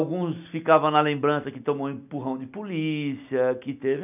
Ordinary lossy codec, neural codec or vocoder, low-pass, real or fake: none; none; 3.6 kHz; real